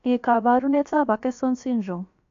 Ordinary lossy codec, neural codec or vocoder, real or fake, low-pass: AAC, 48 kbps; codec, 16 kHz, about 1 kbps, DyCAST, with the encoder's durations; fake; 7.2 kHz